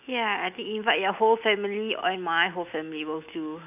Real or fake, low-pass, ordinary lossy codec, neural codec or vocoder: real; 3.6 kHz; none; none